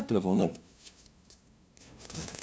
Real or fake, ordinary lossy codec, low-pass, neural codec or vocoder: fake; none; none; codec, 16 kHz, 0.5 kbps, FunCodec, trained on LibriTTS, 25 frames a second